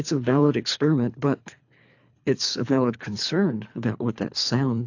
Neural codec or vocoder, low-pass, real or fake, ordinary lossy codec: codec, 24 kHz, 3 kbps, HILCodec; 7.2 kHz; fake; AAC, 48 kbps